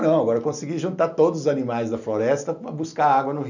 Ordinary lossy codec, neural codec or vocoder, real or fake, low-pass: none; none; real; 7.2 kHz